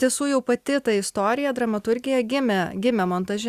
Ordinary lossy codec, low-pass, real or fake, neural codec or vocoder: Opus, 64 kbps; 14.4 kHz; real; none